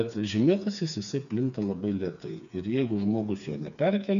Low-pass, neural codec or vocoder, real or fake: 7.2 kHz; codec, 16 kHz, 4 kbps, FreqCodec, smaller model; fake